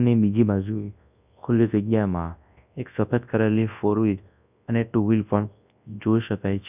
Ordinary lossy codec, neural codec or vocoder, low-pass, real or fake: none; codec, 24 kHz, 0.9 kbps, WavTokenizer, large speech release; 3.6 kHz; fake